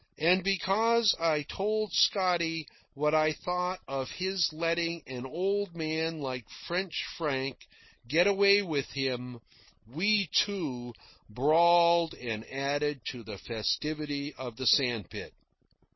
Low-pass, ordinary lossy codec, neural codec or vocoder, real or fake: 7.2 kHz; MP3, 24 kbps; none; real